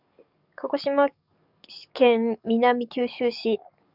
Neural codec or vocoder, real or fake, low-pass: codec, 44.1 kHz, 7.8 kbps, DAC; fake; 5.4 kHz